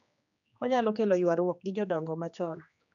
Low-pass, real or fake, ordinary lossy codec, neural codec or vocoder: 7.2 kHz; fake; none; codec, 16 kHz, 2 kbps, X-Codec, HuBERT features, trained on general audio